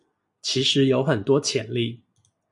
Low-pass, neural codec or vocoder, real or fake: 10.8 kHz; none; real